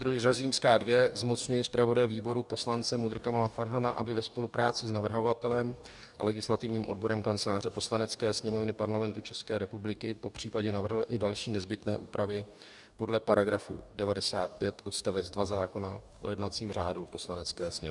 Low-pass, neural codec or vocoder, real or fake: 10.8 kHz; codec, 44.1 kHz, 2.6 kbps, DAC; fake